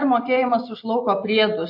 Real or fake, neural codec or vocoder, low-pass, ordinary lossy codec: real; none; 5.4 kHz; MP3, 32 kbps